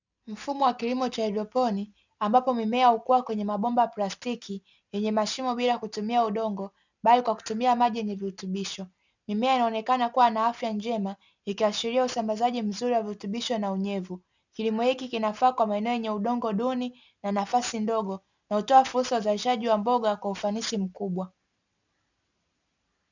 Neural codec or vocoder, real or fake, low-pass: none; real; 7.2 kHz